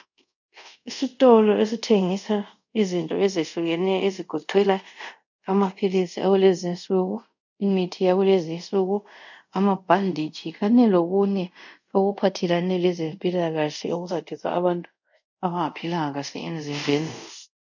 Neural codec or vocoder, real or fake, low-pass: codec, 24 kHz, 0.5 kbps, DualCodec; fake; 7.2 kHz